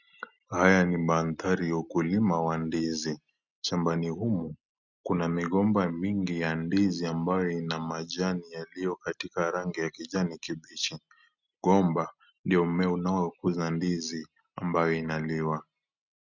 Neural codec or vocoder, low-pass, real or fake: none; 7.2 kHz; real